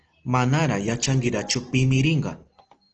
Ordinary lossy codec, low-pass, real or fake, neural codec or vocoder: Opus, 16 kbps; 7.2 kHz; real; none